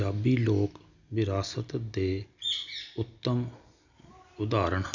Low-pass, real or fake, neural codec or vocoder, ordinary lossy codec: 7.2 kHz; real; none; none